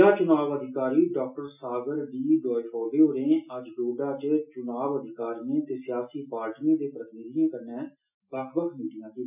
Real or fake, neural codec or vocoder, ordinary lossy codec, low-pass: real; none; none; 3.6 kHz